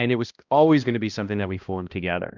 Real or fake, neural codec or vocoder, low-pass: fake; codec, 16 kHz, 1 kbps, X-Codec, HuBERT features, trained on balanced general audio; 7.2 kHz